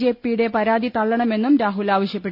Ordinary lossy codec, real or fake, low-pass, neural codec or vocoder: none; real; 5.4 kHz; none